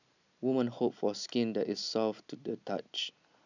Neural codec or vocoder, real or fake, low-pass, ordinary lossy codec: none; real; 7.2 kHz; none